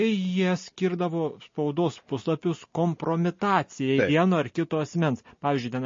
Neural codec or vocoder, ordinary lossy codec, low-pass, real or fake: none; MP3, 32 kbps; 7.2 kHz; real